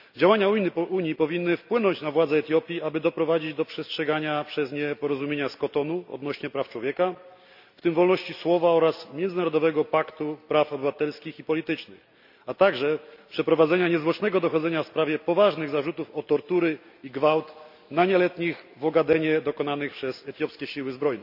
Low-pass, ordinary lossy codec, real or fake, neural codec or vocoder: 5.4 kHz; none; real; none